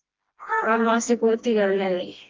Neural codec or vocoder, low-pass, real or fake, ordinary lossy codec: codec, 16 kHz, 1 kbps, FreqCodec, smaller model; 7.2 kHz; fake; Opus, 24 kbps